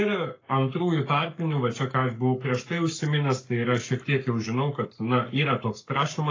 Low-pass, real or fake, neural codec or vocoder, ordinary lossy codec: 7.2 kHz; fake; codec, 44.1 kHz, 7.8 kbps, Pupu-Codec; AAC, 32 kbps